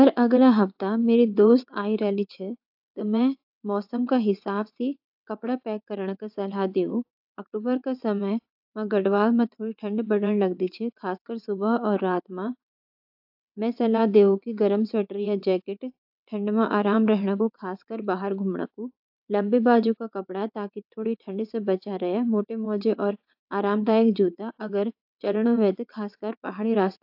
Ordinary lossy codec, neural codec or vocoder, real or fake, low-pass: AAC, 48 kbps; vocoder, 22.05 kHz, 80 mel bands, WaveNeXt; fake; 5.4 kHz